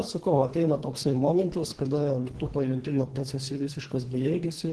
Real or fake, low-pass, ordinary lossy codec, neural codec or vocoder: fake; 10.8 kHz; Opus, 16 kbps; codec, 24 kHz, 1.5 kbps, HILCodec